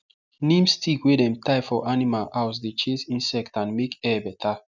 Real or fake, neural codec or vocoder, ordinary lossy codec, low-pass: real; none; none; 7.2 kHz